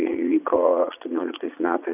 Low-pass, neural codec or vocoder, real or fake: 3.6 kHz; vocoder, 22.05 kHz, 80 mel bands, Vocos; fake